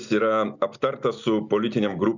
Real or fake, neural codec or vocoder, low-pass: real; none; 7.2 kHz